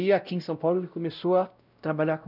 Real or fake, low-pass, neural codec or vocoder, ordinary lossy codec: fake; 5.4 kHz; codec, 16 kHz, 0.5 kbps, X-Codec, WavLM features, trained on Multilingual LibriSpeech; none